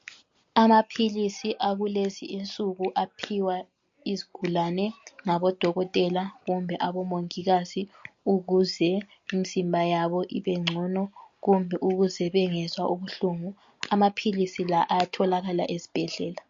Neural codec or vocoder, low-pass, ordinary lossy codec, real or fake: none; 7.2 kHz; MP3, 48 kbps; real